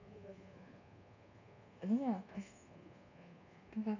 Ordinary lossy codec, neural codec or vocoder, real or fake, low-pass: none; codec, 24 kHz, 1.2 kbps, DualCodec; fake; 7.2 kHz